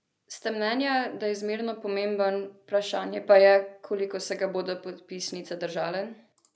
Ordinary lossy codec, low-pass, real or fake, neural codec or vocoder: none; none; real; none